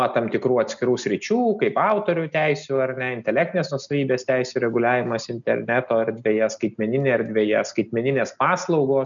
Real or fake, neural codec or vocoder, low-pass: real; none; 7.2 kHz